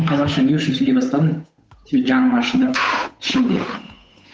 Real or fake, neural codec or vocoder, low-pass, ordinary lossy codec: fake; codec, 16 kHz, 8 kbps, FunCodec, trained on Chinese and English, 25 frames a second; none; none